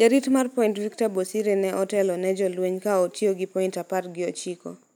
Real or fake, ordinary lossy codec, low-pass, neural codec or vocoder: real; none; none; none